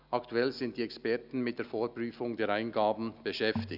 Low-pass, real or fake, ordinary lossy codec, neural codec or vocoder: 5.4 kHz; real; none; none